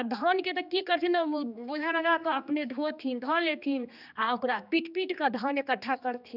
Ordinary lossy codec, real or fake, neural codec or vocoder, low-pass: none; fake; codec, 16 kHz, 2 kbps, X-Codec, HuBERT features, trained on general audio; 5.4 kHz